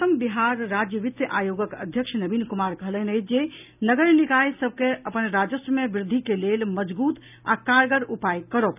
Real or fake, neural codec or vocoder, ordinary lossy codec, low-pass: real; none; none; 3.6 kHz